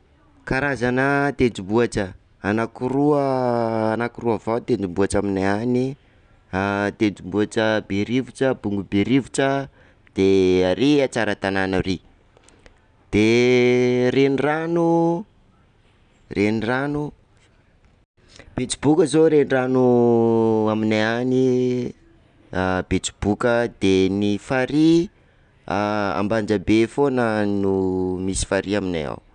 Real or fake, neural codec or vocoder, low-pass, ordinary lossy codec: real; none; 9.9 kHz; none